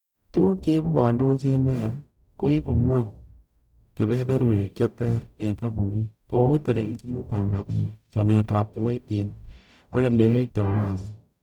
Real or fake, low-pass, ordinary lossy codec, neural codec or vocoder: fake; 19.8 kHz; none; codec, 44.1 kHz, 0.9 kbps, DAC